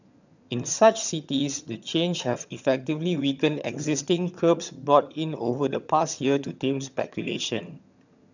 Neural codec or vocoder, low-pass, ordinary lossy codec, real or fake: vocoder, 22.05 kHz, 80 mel bands, HiFi-GAN; 7.2 kHz; none; fake